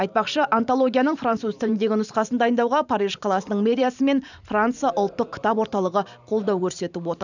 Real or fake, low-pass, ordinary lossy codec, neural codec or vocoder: fake; 7.2 kHz; none; vocoder, 44.1 kHz, 128 mel bands every 512 samples, BigVGAN v2